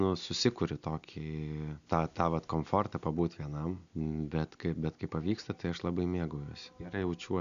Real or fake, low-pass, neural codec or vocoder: real; 7.2 kHz; none